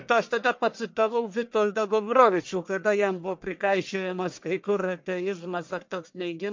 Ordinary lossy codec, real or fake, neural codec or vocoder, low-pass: MP3, 48 kbps; fake; codec, 44.1 kHz, 1.7 kbps, Pupu-Codec; 7.2 kHz